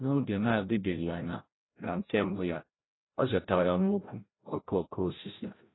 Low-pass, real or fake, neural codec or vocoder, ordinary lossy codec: 7.2 kHz; fake; codec, 16 kHz, 0.5 kbps, FreqCodec, larger model; AAC, 16 kbps